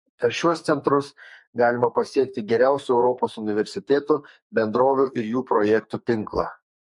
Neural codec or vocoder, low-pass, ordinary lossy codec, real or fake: codec, 44.1 kHz, 2.6 kbps, SNAC; 10.8 kHz; MP3, 48 kbps; fake